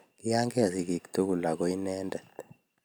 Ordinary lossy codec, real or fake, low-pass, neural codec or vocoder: none; real; none; none